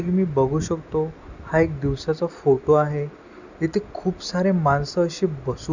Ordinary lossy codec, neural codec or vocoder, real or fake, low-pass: none; none; real; 7.2 kHz